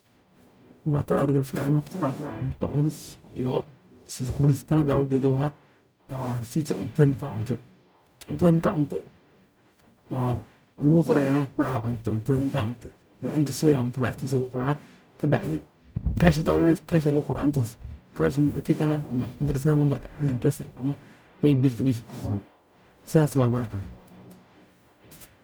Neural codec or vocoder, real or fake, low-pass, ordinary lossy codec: codec, 44.1 kHz, 0.9 kbps, DAC; fake; none; none